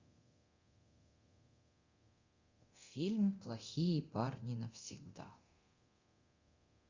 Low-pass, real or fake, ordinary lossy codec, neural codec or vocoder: 7.2 kHz; fake; Opus, 64 kbps; codec, 24 kHz, 0.9 kbps, DualCodec